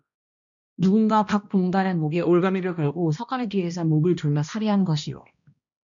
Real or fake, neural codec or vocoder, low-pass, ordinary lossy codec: fake; codec, 16 kHz, 1 kbps, X-Codec, HuBERT features, trained on balanced general audio; 7.2 kHz; MP3, 96 kbps